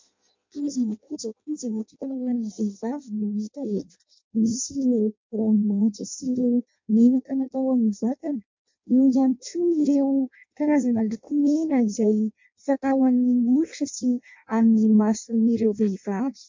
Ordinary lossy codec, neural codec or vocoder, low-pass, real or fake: MP3, 48 kbps; codec, 16 kHz in and 24 kHz out, 0.6 kbps, FireRedTTS-2 codec; 7.2 kHz; fake